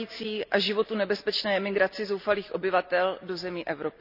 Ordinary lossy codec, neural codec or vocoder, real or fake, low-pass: none; none; real; 5.4 kHz